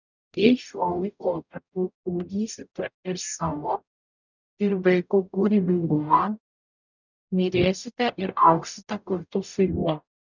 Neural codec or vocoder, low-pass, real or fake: codec, 44.1 kHz, 0.9 kbps, DAC; 7.2 kHz; fake